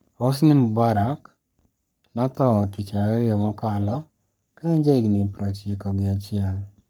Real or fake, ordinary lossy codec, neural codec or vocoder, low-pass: fake; none; codec, 44.1 kHz, 3.4 kbps, Pupu-Codec; none